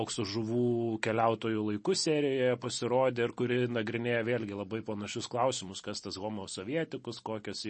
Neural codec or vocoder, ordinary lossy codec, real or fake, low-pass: none; MP3, 32 kbps; real; 10.8 kHz